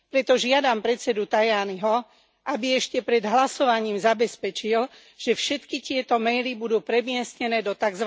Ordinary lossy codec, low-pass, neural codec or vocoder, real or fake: none; none; none; real